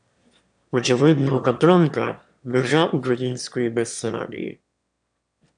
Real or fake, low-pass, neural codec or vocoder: fake; 9.9 kHz; autoencoder, 22.05 kHz, a latent of 192 numbers a frame, VITS, trained on one speaker